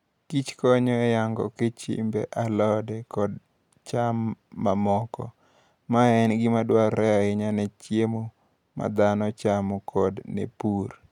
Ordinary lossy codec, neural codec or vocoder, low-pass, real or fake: none; none; 19.8 kHz; real